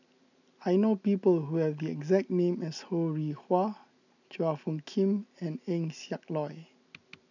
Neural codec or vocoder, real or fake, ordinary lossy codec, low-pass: none; real; none; 7.2 kHz